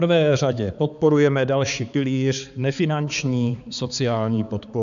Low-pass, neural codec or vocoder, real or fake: 7.2 kHz; codec, 16 kHz, 4 kbps, X-Codec, HuBERT features, trained on balanced general audio; fake